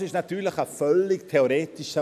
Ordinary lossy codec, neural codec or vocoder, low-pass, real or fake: none; none; 14.4 kHz; real